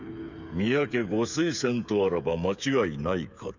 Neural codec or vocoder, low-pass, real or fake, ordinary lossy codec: codec, 16 kHz, 8 kbps, FreqCodec, smaller model; 7.2 kHz; fake; none